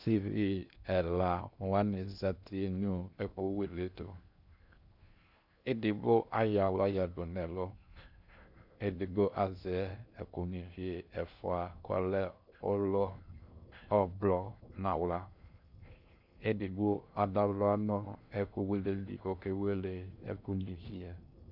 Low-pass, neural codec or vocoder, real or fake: 5.4 kHz; codec, 16 kHz in and 24 kHz out, 0.6 kbps, FocalCodec, streaming, 2048 codes; fake